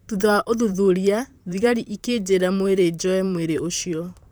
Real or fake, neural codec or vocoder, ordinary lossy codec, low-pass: fake; vocoder, 44.1 kHz, 128 mel bands, Pupu-Vocoder; none; none